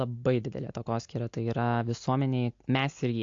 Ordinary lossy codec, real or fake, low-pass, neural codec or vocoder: AAC, 48 kbps; real; 7.2 kHz; none